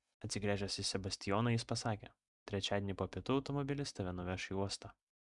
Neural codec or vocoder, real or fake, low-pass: none; real; 10.8 kHz